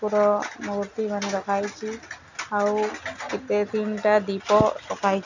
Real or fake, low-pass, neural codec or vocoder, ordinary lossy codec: real; 7.2 kHz; none; none